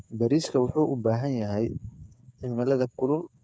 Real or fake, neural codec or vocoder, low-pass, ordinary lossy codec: fake; codec, 16 kHz, 8 kbps, FreqCodec, smaller model; none; none